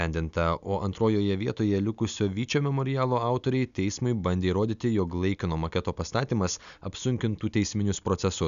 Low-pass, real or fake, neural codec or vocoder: 7.2 kHz; real; none